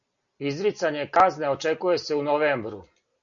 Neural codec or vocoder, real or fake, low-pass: none; real; 7.2 kHz